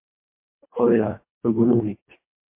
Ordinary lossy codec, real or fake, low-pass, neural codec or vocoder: MP3, 24 kbps; fake; 3.6 kHz; codec, 24 kHz, 1.5 kbps, HILCodec